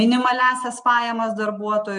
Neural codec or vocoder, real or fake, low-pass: none; real; 9.9 kHz